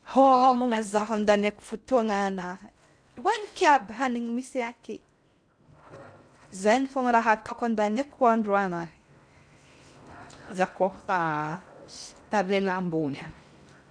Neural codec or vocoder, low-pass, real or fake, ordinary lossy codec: codec, 16 kHz in and 24 kHz out, 0.6 kbps, FocalCodec, streaming, 4096 codes; 9.9 kHz; fake; none